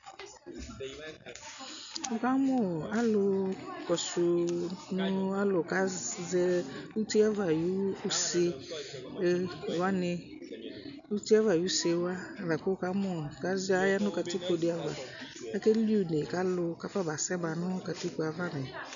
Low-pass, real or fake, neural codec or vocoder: 7.2 kHz; real; none